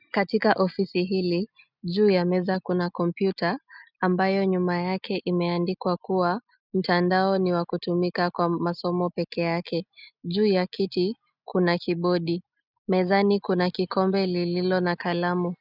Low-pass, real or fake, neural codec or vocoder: 5.4 kHz; real; none